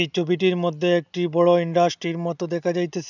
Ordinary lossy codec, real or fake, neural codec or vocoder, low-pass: none; real; none; 7.2 kHz